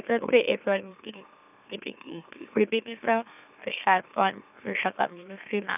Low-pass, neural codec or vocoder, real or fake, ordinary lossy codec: 3.6 kHz; autoencoder, 44.1 kHz, a latent of 192 numbers a frame, MeloTTS; fake; none